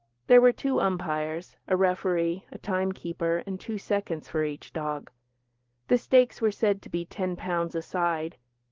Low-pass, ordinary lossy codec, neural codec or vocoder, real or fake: 7.2 kHz; Opus, 16 kbps; none; real